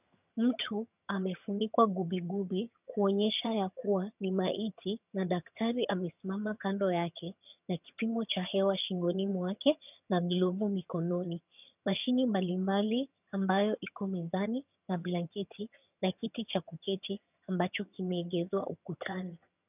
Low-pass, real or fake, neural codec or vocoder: 3.6 kHz; fake; vocoder, 22.05 kHz, 80 mel bands, HiFi-GAN